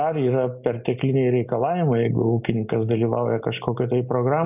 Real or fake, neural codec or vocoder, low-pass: real; none; 3.6 kHz